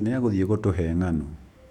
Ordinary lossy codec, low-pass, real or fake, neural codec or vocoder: none; 19.8 kHz; fake; autoencoder, 48 kHz, 128 numbers a frame, DAC-VAE, trained on Japanese speech